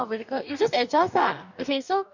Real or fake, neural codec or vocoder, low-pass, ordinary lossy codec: fake; codec, 44.1 kHz, 2.6 kbps, DAC; 7.2 kHz; none